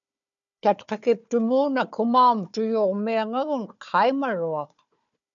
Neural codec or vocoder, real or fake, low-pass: codec, 16 kHz, 4 kbps, FunCodec, trained on Chinese and English, 50 frames a second; fake; 7.2 kHz